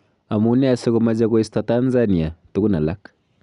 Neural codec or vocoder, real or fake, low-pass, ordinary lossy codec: none; real; 10.8 kHz; none